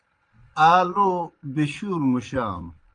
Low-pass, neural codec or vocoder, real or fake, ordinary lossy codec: 9.9 kHz; vocoder, 22.05 kHz, 80 mel bands, Vocos; fake; Opus, 32 kbps